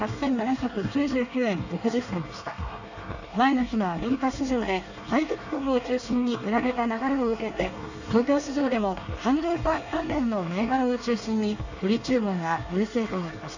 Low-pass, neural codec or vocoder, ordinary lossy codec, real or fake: 7.2 kHz; codec, 24 kHz, 1 kbps, SNAC; none; fake